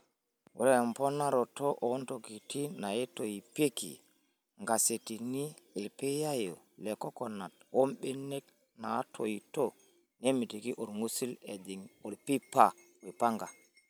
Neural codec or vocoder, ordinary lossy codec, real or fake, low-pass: none; none; real; none